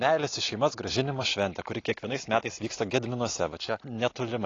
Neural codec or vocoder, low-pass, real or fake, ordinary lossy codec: none; 7.2 kHz; real; AAC, 32 kbps